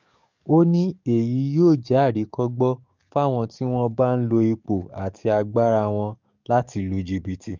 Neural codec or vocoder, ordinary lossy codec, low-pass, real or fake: codec, 44.1 kHz, 7.8 kbps, DAC; none; 7.2 kHz; fake